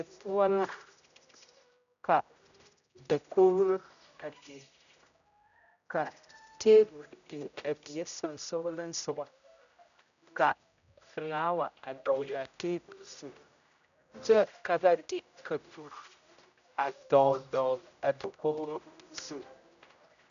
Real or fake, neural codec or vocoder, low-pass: fake; codec, 16 kHz, 0.5 kbps, X-Codec, HuBERT features, trained on general audio; 7.2 kHz